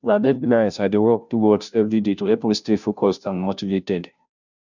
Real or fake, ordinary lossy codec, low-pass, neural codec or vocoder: fake; none; 7.2 kHz; codec, 16 kHz, 0.5 kbps, FunCodec, trained on Chinese and English, 25 frames a second